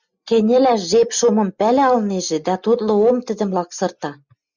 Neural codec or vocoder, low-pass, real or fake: none; 7.2 kHz; real